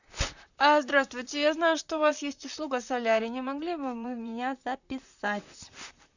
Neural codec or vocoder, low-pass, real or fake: vocoder, 44.1 kHz, 128 mel bands, Pupu-Vocoder; 7.2 kHz; fake